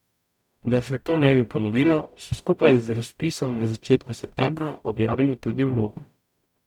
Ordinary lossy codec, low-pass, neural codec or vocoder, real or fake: none; 19.8 kHz; codec, 44.1 kHz, 0.9 kbps, DAC; fake